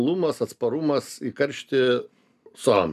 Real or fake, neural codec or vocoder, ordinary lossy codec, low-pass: real; none; MP3, 96 kbps; 14.4 kHz